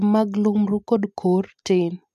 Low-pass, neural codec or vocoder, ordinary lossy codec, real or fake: 14.4 kHz; none; AAC, 96 kbps; real